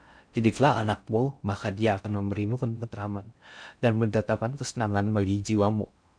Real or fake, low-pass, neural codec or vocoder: fake; 9.9 kHz; codec, 16 kHz in and 24 kHz out, 0.6 kbps, FocalCodec, streaming, 4096 codes